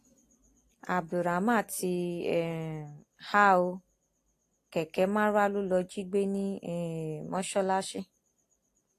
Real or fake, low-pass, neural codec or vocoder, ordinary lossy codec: real; 14.4 kHz; none; AAC, 48 kbps